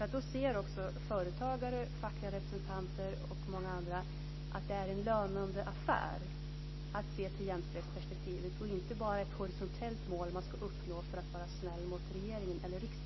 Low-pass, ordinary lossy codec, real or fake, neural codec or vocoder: 7.2 kHz; MP3, 24 kbps; real; none